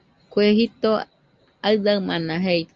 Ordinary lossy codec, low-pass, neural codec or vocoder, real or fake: Opus, 32 kbps; 7.2 kHz; none; real